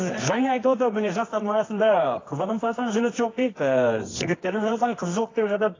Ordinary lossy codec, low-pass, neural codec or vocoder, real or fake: AAC, 32 kbps; 7.2 kHz; codec, 24 kHz, 0.9 kbps, WavTokenizer, medium music audio release; fake